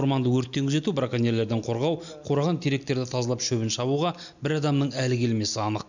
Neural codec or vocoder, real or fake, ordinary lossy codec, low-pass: none; real; none; 7.2 kHz